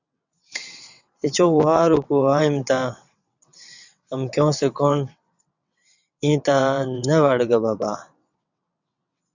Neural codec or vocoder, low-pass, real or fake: vocoder, 22.05 kHz, 80 mel bands, WaveNeXt; 7.2 kHz; fake